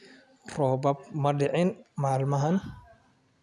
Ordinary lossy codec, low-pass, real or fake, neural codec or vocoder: none; none; real; none